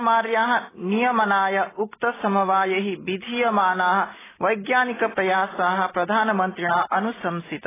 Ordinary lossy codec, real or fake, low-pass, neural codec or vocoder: AAC, 16 kbps; real; 3.6 kHz; none